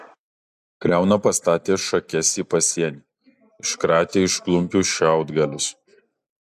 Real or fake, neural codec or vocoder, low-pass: real; none; 14.4 kHz